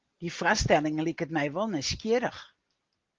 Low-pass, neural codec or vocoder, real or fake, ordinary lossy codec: 7.2 kHz; none; real; Opus, 32 kbps